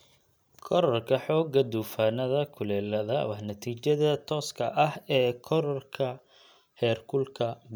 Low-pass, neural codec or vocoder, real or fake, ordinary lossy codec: none; none; real; none